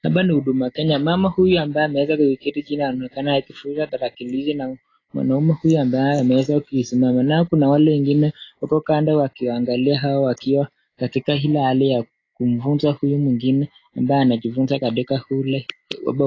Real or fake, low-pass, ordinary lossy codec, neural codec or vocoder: real; 7.2 kHz; AAC, 32 kbps; none